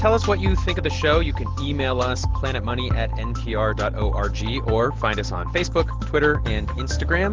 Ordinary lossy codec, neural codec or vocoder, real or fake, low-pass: Opus, 16 kbps; none; real; 7.2 kHz